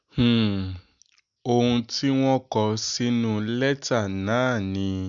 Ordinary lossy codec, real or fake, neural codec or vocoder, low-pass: MP3, 96 kbps; real; none; 7.2 kHz